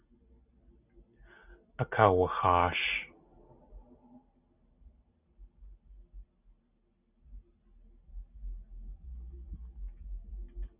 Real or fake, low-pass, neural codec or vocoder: real; 3.6 kHz; none